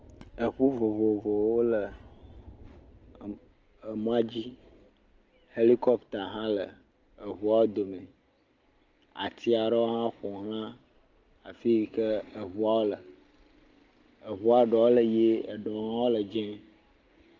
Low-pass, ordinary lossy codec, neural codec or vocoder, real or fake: 7.2 kHz; Opus, 24 kbps; none; real